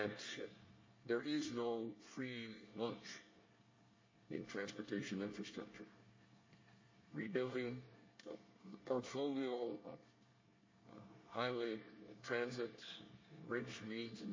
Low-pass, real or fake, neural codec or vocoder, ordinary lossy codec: 7.2 kHz; fake; codec, 24 kHz, 1 kbps, SNAC; MP3, 32 kbps